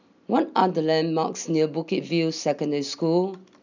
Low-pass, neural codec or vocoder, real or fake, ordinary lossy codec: 7.2 kHz; none; real; none